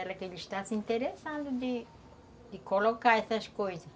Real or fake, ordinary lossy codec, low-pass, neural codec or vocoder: real; none; none; none